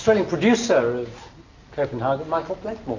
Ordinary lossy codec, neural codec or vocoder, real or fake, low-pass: AAC, 48 kbps; none; real; 7.2 kHz